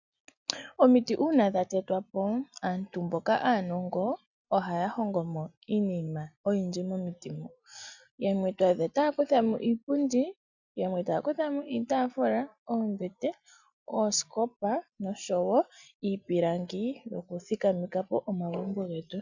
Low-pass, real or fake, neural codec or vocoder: 7.2 kHz; real; none